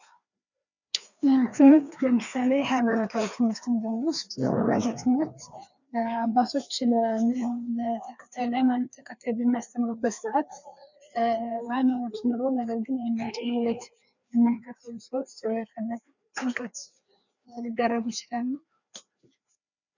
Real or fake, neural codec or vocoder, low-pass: fake; codec, 16 kHz, 2 kbps, FreqCodec, larger model; 7.2 kHz